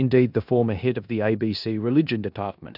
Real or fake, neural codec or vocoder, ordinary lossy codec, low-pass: fake; codec, 16 kHz in and 24 kHz out, 0.9 kbps, LongCat-Audio-Codec, four codebook decoder; AAC, 48 kbps; 5.4 kHz